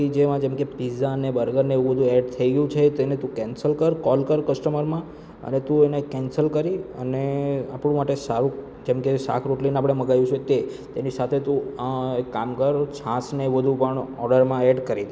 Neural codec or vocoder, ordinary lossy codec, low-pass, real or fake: none; none; none; real